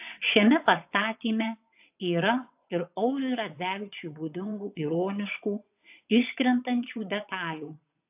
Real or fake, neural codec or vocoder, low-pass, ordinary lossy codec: fake; vocoder, 22.05 kHz, 80 mel bands, WaveNeXt; 3.6 kHz; AAC, 32 kbps